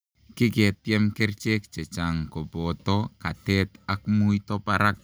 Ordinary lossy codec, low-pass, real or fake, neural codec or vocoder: none; none; real; none